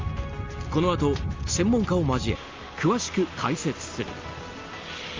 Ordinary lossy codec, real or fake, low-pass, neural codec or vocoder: Opus, 32 kbps; real; 7.2 kHz; none